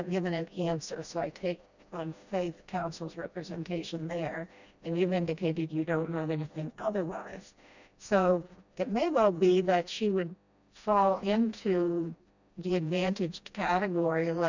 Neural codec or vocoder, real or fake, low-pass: codec, 16 kHz, 1 kbps, FreqCodec, smaller model; fake; 7.2 kHz